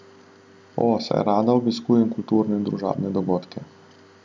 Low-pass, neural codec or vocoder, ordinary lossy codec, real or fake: none; none; none; real